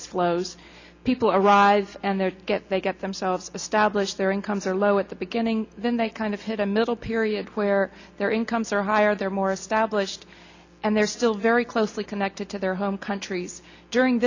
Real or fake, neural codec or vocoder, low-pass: real; none; 7.2 kHz